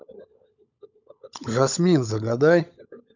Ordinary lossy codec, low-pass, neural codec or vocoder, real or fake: none; 7.2 kHz; codec, 16 kHz, 16 kbps, FunCodec, trained on LibriTTS, 50 frames a second; fake